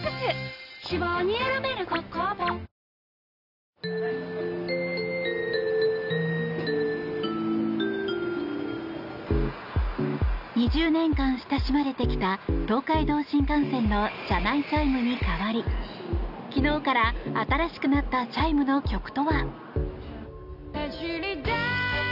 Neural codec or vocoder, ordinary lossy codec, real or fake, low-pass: none; none; real; 5.4 kHz